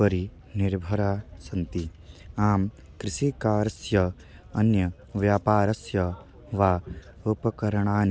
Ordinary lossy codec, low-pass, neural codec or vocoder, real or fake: none; none; none; real